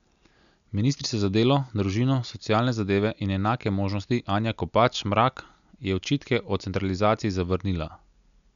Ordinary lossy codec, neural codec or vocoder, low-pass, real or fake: none; none; 7.2 kHz; real